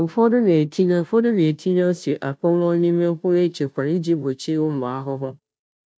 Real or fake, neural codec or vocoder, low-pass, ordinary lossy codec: fake; codec, 16 kHz, 0.5 kbps, FunCodec, trained on Chinese and English, 25 frames a second; none; none